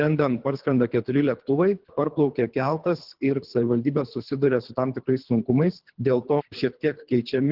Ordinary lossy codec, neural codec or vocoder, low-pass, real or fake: Opus, 16 kbps; codec, 24 kHz, 3 kbps, HILCodec; 5.4 kHz; fake